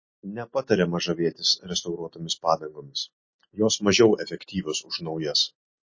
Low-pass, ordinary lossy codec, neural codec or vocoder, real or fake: 7.2 kHz; MP3, 32 kbps; none; real